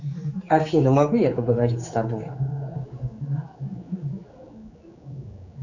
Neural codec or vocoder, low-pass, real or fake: codec, 16 kHz, 4 kbps, X-Codec, HuBERT features, trained on general audio; 7.2 kHz; fake